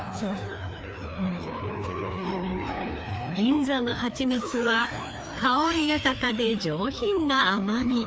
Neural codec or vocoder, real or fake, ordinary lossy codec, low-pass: codec, 16 kHz, 2 kbps, FreqCodec, larger model; fake; none; none